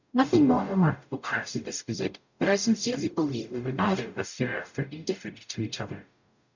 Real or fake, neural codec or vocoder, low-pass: fake; codec, 44.1 kHz, 0.9 kbps, DAC; 7.2 kHz